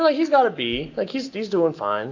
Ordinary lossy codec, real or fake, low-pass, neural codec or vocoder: AAC, 48 kbps; real; 7.2 kHz; none